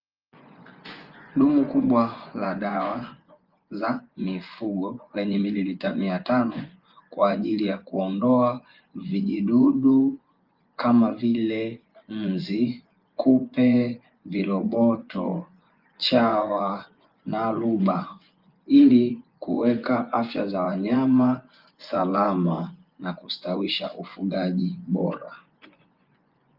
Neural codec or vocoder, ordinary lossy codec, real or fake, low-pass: vocoder, 24 kHz, 100 mel bands, Vocos; Opus, 24 kbps; fake; 5.4 kHz